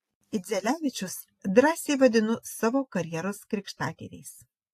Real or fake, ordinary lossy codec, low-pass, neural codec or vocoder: real; AAC, 64 kbps; 14.4 kHz; none